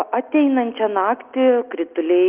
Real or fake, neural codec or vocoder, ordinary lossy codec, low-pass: real; none; Opus, 24 kbps; 3.6 kHz